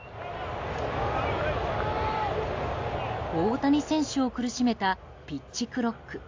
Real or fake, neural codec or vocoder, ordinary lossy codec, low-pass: real; none; AAC, 48 kbps; 7.2 kHz